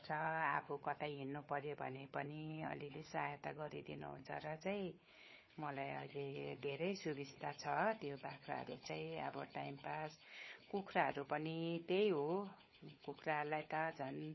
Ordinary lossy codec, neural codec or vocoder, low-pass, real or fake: MP3, 24 kbps; codec, 16 kHz, 4 kbps, FunCodec, trained on Chinese and English, 50 frames a second; 7.2 kHz; fake